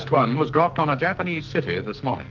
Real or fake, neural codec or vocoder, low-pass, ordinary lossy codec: fake; codec, 44.1 kHz, 2.6 kbps, SNAC; 7.2 kHz; Opus, 24 kbps